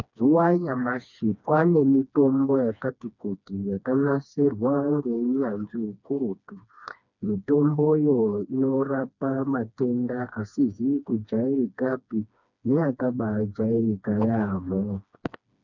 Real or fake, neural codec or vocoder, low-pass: fake; codec, 16 kHz, 2 kbps, FreqCodec, smaller model; 7.2 kHz